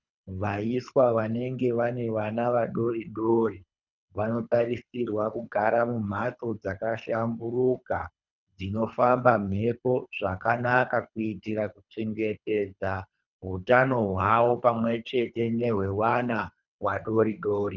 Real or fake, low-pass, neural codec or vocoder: fake; 7.2 kHz; codec, 24 kHz, 3 kbps, HILCodec